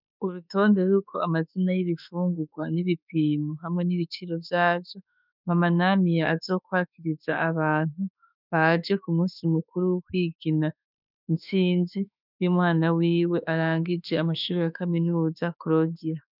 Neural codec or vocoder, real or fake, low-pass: autoencoder, 48 kHz, 32 numbers a frame, DAC-VAE, trained on Japanese speech; fake; 5.4 kHz